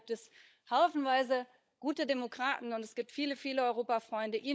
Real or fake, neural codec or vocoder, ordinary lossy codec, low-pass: fake; codec, 16 kHz, 16 kbps, FunCodec, trained on LibriTTS, 50 frames a second; none; none